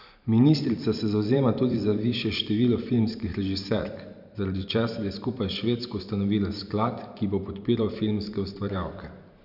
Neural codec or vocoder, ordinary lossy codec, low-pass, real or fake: vocoder, 44.1 kHz, 128 mel bands every 512 samples, BigVGAN v2; none; 5.4 kHz; fake